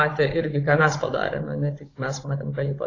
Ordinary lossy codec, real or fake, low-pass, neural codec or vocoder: AAC, 32 kbps; fake; 7.2 kHz; codec, 16 kHz, 16 kbps, FunCodec, trained on Chinese and English, 50 frames a second